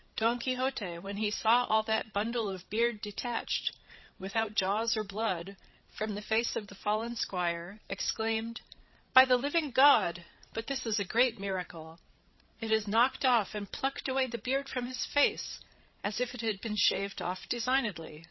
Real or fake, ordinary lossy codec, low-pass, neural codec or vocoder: fake; MP3, 24 kbps; 7.2 kHz; codec, 16 kHz, 16 kbps, FreqCodec, larger model